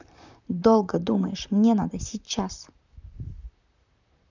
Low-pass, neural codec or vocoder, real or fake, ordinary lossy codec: 7.2 kHz; vocoder, 44.1 kHz, 128 mel bands, Pupu-Vocoder; fake; none